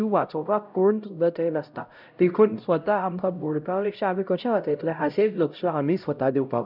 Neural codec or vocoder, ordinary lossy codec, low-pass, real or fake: codec, 16 kHz, 0.5 kbps, X-Codec, HuBERT features, trained on LibriSpeech; none; 5.4 kHz; fake